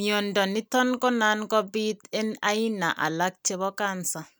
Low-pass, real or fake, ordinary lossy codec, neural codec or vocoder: none; real; none; none